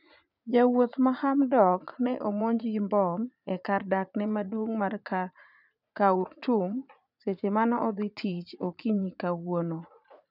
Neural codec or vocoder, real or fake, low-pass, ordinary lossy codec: vocoder, 44.1 kHz, 80 mel bands, Vocos; fake; 5.4 kHz; none